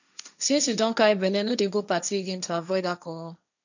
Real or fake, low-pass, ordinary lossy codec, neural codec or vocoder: fake; 7.2 kHz; none; codec, 16 kHz, 1.1 kbps, Voila-Tokenizer